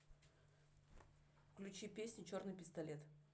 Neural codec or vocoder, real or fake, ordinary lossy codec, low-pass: none; real; none; none